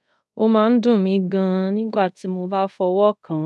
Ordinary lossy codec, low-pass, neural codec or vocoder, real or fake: none; none; codec, 24 kHz, 0.5 kbps, DualCodec; fake